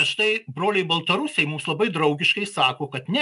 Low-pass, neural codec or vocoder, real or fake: 10.8 kHz; none; real